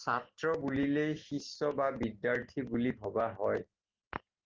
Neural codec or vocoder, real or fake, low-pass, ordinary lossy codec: none; real; 7.2 kHz; Opus, 16 kbps